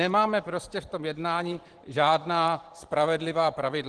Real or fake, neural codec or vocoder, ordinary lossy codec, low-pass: fake; vocoder, 44.1 kHz, 128 mel bands every 512 samples, BigVGAN v2; Opus, 16 kbps; 10.8 kHz